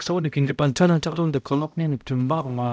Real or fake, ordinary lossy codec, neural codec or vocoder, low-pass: fake; none; codec, 16 kHz, 0.5 kbps, X-Codec, HuBERT features, trained on balanced general audio; none